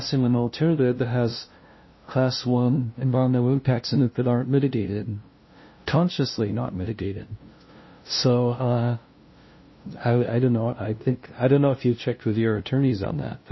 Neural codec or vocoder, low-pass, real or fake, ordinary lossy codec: codec, 16 kHz, 0.5 kbps, FunCodec, trained on LibriTTS, 25 frames a second; 7.2 kHz; fake; MP3, 24 kbps